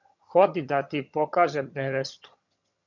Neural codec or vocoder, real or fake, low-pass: vocoder, 22.05 kHz, 80 mel bands, HiFi-GAN; fake; 7.2 kHz